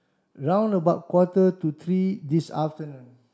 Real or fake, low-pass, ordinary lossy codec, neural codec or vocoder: real; none; none; none